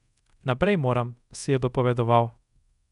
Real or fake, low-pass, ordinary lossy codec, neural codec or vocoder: fake; 10.8 kHz; none; codec, 24 kHz, 0.5 kbps, DualCodec